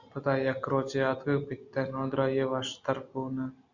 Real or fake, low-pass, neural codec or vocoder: real; 7.2 kHz; none